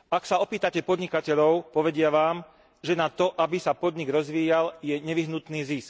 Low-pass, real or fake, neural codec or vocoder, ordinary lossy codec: none; real; none; none